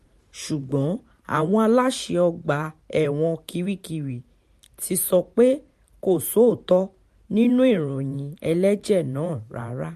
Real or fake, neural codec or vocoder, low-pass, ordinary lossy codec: fake; vocoder, 44.1 kHz, 128 mel bands every 512 samples, BigVGAN v2; 14.4 kHz; MP3, 64 kbps